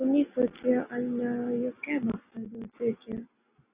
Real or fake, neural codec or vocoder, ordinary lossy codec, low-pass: real; none; Opus, 64 kbps; 3.6 kHz